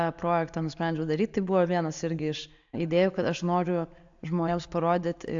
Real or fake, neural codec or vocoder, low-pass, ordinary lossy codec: real; none; 7.2 kHz; AAC, 64 kbps